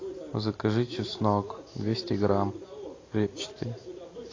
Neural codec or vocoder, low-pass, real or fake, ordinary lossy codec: none; 7.2 kHz; real; AAC, 32 kbps